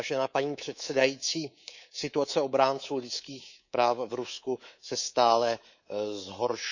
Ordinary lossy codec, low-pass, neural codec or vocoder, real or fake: none; 7.2 kHz; codec, 24 kHz, 3.1 kbps, DualCodec; fake